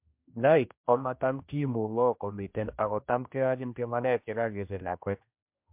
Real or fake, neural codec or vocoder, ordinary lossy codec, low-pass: fake; codec, 16 kHz, 1 kbps, X-Codec, HuBERT features, trained on general audio; MP3, 32 kbps; 3.6 kHz